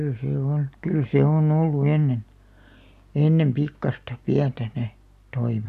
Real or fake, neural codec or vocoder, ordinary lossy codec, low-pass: real; none; none; 14.4 kHz